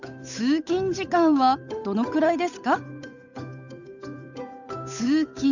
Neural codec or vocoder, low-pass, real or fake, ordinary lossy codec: codec, 16 kHz, 8 kbps, FunCodec, trained on Chinese and English, 25 frames a second; 7.2 kHz; fake; none